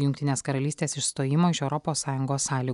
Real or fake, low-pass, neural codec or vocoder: real; 10.8 kHz; none